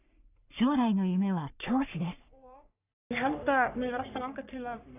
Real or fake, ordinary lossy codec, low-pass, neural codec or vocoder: fake; none; 3.6 kHz; codec, 44.1 kHz, 3.4 kbps, Pupu-Codec